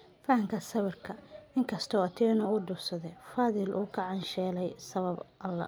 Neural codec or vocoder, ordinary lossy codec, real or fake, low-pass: none; none; real; none